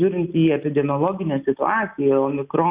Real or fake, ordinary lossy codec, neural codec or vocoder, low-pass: real; Opus, 64 kbps; none; 3.6 kHz